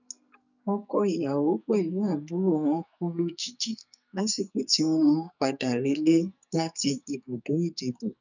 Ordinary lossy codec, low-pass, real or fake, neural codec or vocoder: none; 7.2 kHz; fake; codec, 44.1 kHz, 2.6 kbps, SNAC